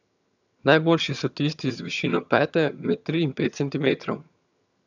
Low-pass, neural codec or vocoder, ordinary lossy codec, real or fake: 7.2 kHz; vocoder, 22.05 kHz, 80 mel bands, HiFi-GAN; none; fake